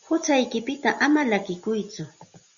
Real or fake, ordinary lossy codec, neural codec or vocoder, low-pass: real; Opus, 64 kbps; none; 7.2 kHz